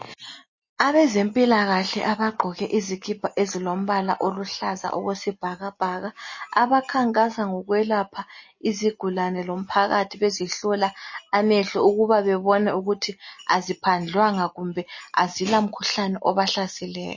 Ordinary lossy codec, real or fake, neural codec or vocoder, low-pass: MP3, 32 kbps; real; none; 7.2 kHz